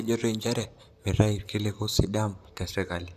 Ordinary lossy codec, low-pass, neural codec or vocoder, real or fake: Opus, 64 kbps; 14.4 kHz; vocoder, 44.1 kHz, 128 mel bands, Pupu-Vocoder; fake